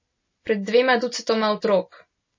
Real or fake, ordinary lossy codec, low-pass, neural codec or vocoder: real; MP3, 32 kbps; 7.2 kHz; none